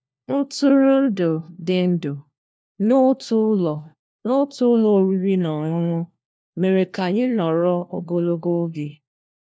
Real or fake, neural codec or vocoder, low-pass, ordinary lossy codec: fake; codec, 16 kHz, 1 kbps, FunCodec, trained on LibriTTS, 50 frames a second; none; none